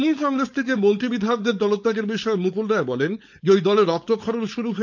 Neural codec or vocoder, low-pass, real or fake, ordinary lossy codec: codec, 16 kHz, 4.8 kbps, FACodec; 7.2 kHz; fake; none